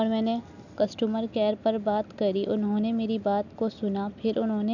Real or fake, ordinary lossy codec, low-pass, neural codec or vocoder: real; none; 7.2 kHz; none